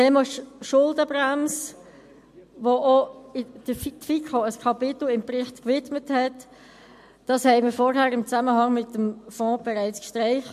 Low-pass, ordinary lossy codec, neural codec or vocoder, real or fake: 14.4 kHz; MP3, 64 kbps; none; real